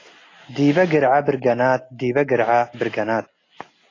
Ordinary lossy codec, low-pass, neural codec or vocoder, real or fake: AAC, 32 kbps; 7.2 kHz; none; real